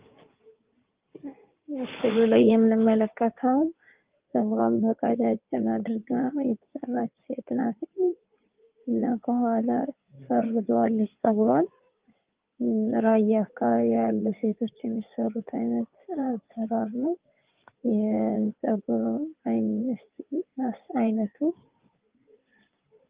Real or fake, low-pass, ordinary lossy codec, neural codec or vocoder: fake; 3.6 kHz; Opus, 32 kbps; codec, 16 kHz in and 24 kHz out, 2.2 kbps, FireRedTTS-2 codec